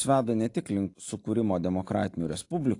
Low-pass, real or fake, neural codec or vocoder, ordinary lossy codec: 10.8 kHz; real; none; AAC, 48 kbps